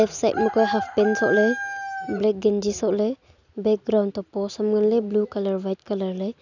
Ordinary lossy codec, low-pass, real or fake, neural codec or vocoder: none; 7.2 kHz; real; none